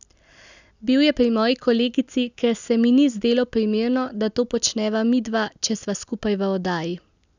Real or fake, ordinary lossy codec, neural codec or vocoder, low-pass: real; none; none; 7.2 kHz